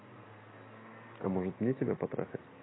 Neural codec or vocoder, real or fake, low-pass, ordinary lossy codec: none; real; 7.2 kHz; AAC, 16 kbps